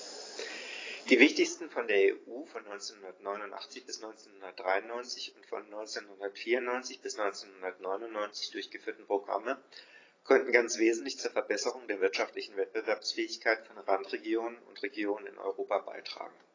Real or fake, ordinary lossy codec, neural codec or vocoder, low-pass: real; AAC, 32 kbps; none; 7.2 kHz